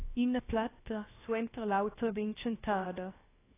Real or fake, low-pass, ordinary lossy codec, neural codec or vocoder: fake; 3.6 kHz; AAC, 16 kbps; codec, 16 kHz, 0.7 kbps, FocalCodec